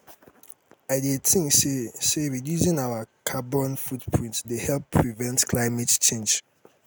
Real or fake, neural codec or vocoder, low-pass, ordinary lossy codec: real; none; none; none